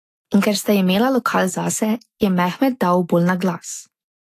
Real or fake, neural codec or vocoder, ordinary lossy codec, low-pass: fake; autoencoder, 48 kHz, 128 numbers a frame, DAC-VAE, trained on Japanese speech; AAC, 48 kbps; 14.4 kHz